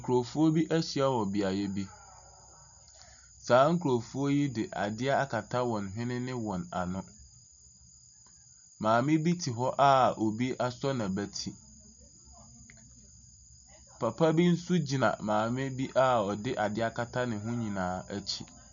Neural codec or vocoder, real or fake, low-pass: none; real; 7.2 kHz